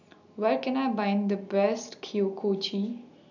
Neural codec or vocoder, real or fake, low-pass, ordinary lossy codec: none; real; 7.2 kHz; none